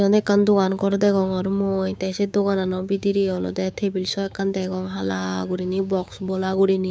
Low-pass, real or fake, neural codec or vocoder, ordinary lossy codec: none; fake; codec, 16 kHz, 6 kbps, DAC; none